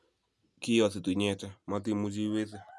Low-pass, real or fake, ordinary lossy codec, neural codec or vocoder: none; real; none; none